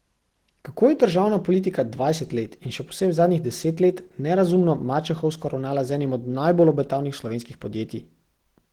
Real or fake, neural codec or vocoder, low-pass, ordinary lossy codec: real; none; 19.8 kHz; Opus, 16 kbps